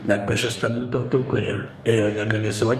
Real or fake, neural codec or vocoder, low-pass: fake; codec, 44.1 kHz, 2.6 kbps, DAC; 14.4 kHz